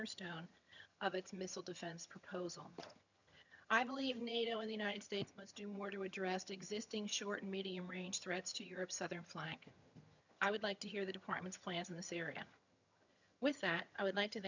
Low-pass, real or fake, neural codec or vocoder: 7.2 kHz; fake; vocoder, 22.05 kHz, 80 mel bands, HiFi-GAN